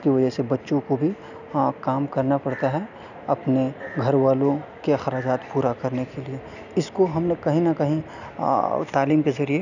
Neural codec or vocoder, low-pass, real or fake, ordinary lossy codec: none; 7.2 kHz; real; none